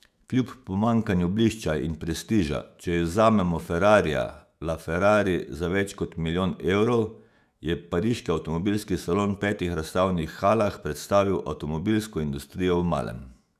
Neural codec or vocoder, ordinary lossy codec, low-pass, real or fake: autoencoder, 48 kHz, 128 numbers a frame, DAC-VAE, trained on Japanese speech; none; 14.4 kHz; fake